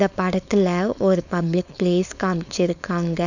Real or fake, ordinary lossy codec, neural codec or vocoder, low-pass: fake; none; codec, 16 kHz, 4.8 kbps, FACodec; 7.2 kHz